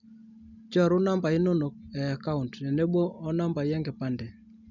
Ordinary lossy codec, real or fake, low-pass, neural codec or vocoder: none; real; 7.2 kHz; none